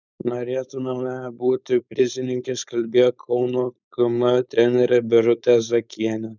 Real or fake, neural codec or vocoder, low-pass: fake; codec, 16 kHz, 4.8 kbps, FACodec; 7.2 kHz